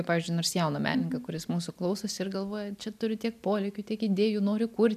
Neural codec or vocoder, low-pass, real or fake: none; 14.4 kHz; real